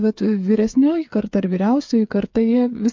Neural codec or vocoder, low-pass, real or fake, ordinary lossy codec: codec, 16 kHz, 8 kbps, FreqCodec, smaller model; 7.2 kHz; fake; MP3, 48 kbps